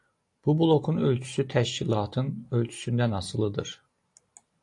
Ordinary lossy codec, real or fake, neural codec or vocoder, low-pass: AAC, 48 kbps; real; none; 10.8 kHz